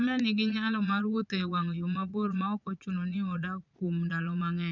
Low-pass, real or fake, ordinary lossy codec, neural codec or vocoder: 7.2 kHz; fake; none; vocoder, 22.05 kHz, 80 mel bands, Vocos